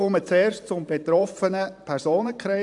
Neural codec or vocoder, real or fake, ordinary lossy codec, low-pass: vocoder, 44.1 kHz, 128 mel bands every 512 samples, BigVGAN v2; fake; AAC, 64 kbps; 10.8 kHz